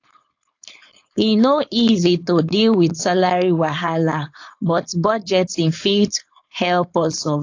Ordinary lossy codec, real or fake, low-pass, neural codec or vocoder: AAC, 48 kbps; fake; 7.2 kHz; codec, 16 kHz, 4.8 kbps, FACodec